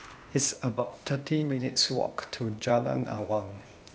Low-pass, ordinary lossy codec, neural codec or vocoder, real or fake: none; none; codec, 16 kHz, 0.8 kbps, ZipCodec; fake